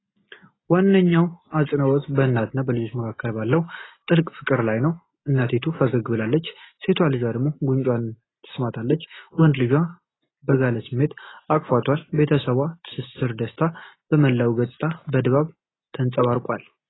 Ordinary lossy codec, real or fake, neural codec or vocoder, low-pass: AAC, 16 kbps; real; none; 7.2 kHz